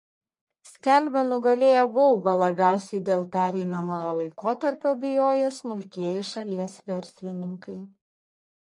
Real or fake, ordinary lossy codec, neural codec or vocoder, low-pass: fake; MP3, 48 kbps; codec, 44.1 kHz, 1.7 kbps, Pupu-Codec; 10.8 kHz